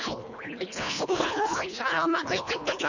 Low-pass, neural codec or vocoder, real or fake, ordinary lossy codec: 7.2 kHz; codec, 24 kHz, 1.5 kbps, HILCodec; fake; none